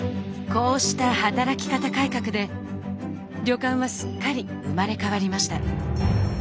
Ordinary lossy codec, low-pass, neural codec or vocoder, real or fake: none; none; none; real